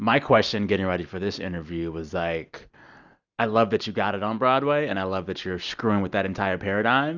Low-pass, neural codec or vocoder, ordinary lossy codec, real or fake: 7.2 kHz; none; Opus, 64 kbps; real